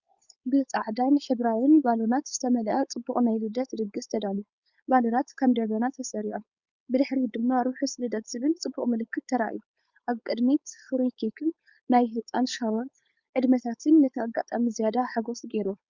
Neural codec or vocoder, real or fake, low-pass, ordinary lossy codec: codec, 16 kHz, 4.8 kbps, FACodec; fake; 7.2 kHz; Opus, 64 kbps